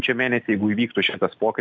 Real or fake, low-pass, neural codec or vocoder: real; 7.2 kHz; none